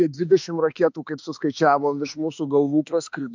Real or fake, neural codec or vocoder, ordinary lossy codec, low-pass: fake; autoencoder, 48 kHz, 32 numbers a frame, DAC-VAE, trained on Japanese speech; MP3, 64 kbps; 7.2 kHz